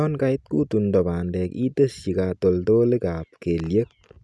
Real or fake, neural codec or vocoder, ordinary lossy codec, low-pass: real; none; none; none